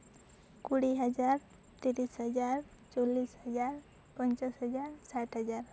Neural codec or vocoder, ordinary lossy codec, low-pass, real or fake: none; none; none; real